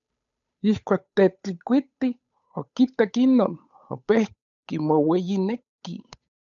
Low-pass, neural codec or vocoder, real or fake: 7.2 kHz; codec, 16 kHz, 8 kbps, FunCodec, trained on Chinese and English, 25 frames a second; fake